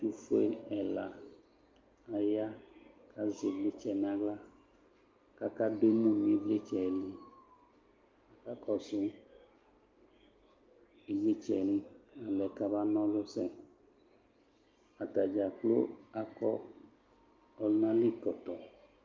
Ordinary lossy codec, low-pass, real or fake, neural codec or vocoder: Opus, 32 kbps; 7.2 kHz; real; none